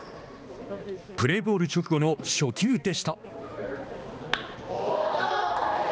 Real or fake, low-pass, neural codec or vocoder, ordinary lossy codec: fake; none; codec, 16 kHz, 2 kbps, X-Codec, HuBERT features, trained on balanced general audio; none